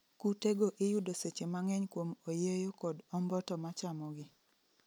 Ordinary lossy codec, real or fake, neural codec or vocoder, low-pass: none; real; none; none